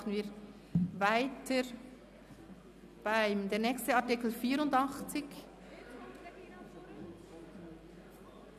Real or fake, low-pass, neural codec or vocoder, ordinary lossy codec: real; 14.4 kHz; none; none